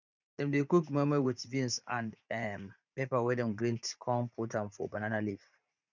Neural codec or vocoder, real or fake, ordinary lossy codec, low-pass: vocoder, 44.1 kHz, 80 mel bands, Vocos; fake; none; 7.2 kHz